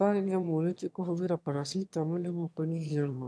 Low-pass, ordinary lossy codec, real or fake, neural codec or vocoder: none; none; fake; autoencoder, 22.05 kHz, a latent of 192 numbers a frame, VITS, trained on one speaker